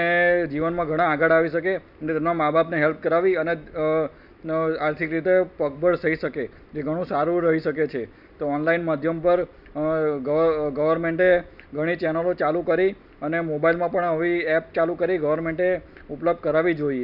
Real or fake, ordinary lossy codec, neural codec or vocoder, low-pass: real; none; none; 5.4 kHz